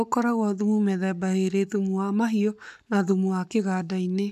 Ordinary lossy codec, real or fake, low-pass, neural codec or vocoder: none; fake; 14.4 kHz; codec, 44.1 kHz, 7.8 kbps, Pupu-Codec